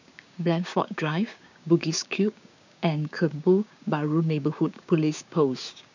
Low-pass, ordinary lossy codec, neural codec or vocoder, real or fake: 7.2 kHz; none; codec, 16 kHz, 6 kbps, DAC; fake